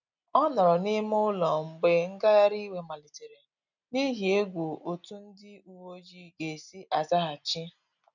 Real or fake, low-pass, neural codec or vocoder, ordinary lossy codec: real; 7.2 kHz; none; none